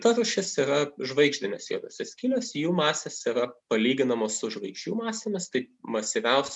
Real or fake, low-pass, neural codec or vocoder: real; 10.8 kHz; none